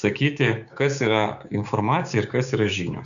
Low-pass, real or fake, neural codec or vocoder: 7.2 kHz; fake; codec, 16 kHz, 6 kbps, DAC